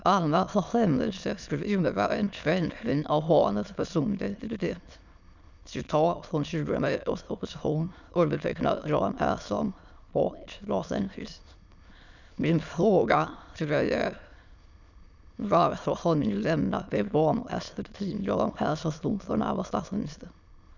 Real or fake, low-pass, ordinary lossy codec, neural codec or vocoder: fake; 7.2 kHz; none; autoencoder, 22.05 kHz, a latent of 192 numbers a frame, VITS, trained on many speakers